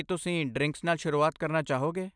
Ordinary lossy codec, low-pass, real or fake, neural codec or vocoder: none; 9.9 kHz; real; none